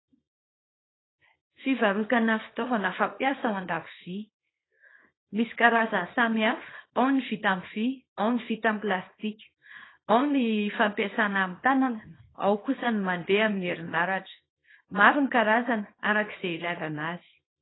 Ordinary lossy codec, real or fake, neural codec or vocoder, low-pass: AAC, 16 kbps; fake; codec, 24 kHz, 0.9 kbps, WavTokenizer, small release; 7.2 kHz